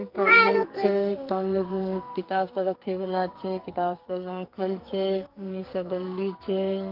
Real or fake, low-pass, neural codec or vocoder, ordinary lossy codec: fake; 5.4 kHz; codec, 44.1 kHz, 2.6 kbps, SNAC; Opus, 32 kbps